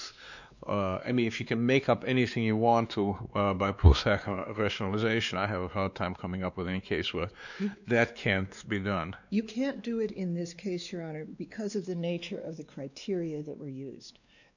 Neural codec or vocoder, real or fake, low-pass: codec, 16 kHz, 2 kbps, X-Codec, WavLM features, trained on Multilingual LibriSpeech; fake; 7.2 kHz